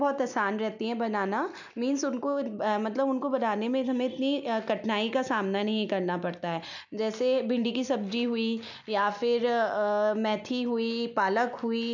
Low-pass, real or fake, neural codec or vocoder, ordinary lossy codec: 7.2 kHz; real; none; none